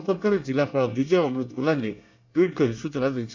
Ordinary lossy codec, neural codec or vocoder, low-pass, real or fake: MP3, 64 kbps; codec, 24 kHz, 1 kbps, SNAC; 7.2 kHz; fake